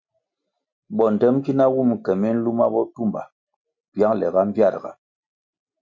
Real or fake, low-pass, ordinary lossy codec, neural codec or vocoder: real; 7.2 kHz; MP3, 64 kbps; none